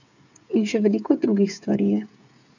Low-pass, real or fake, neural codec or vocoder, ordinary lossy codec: 7.2 kHz; fake; codec, 16 kHz, 8 kbps, FreqCodec, smaller model; none